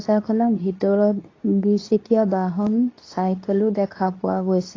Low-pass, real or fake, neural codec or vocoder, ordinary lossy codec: 7.2 kHz; fake; codec, 24 kHz, 0.9 kbps, WavTokenizer, medium speech release version 2; AAC, 32 kbps